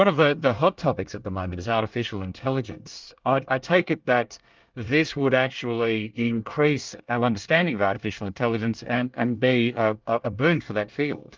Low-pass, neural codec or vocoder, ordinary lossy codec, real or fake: 7.2 kHz; codec, 24 kHz, 1 kbps, SNAC; Opus, 24 kbps; fake